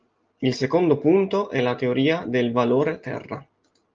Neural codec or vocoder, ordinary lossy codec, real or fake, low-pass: none; Opus, 24 kbps; real; 7.2 kHz